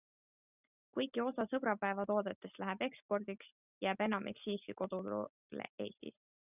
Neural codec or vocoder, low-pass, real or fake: none; 3.6 kHz; real